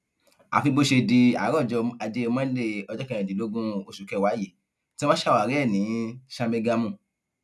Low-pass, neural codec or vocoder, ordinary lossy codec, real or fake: none; none; none; real